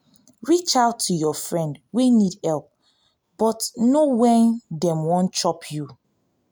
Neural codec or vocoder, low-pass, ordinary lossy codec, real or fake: none; none; none; real